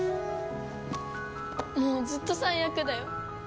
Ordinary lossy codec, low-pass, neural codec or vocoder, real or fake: none; none; none; real